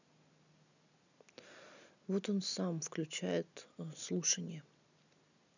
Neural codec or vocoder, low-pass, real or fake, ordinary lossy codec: none; 7.2 kHz; real; none